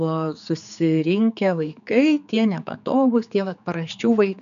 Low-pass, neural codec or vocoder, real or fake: 7.2 kHz; codec, 16 kHz, 4 kbps, X-Codec, HuBERT features, trained on general audio; fake